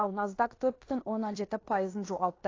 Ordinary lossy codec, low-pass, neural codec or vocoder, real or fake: AAC, 32 kbps; 7.2 kHz; codec, 16 kHz in and 24 kHz out, 0.9 kbps, LongCat-Audio-Codec, fine tuned four codebook decoder; fake